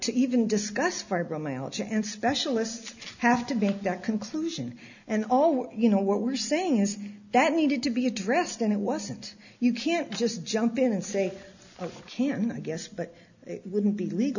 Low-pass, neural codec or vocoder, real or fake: 7.2 kHz; none; real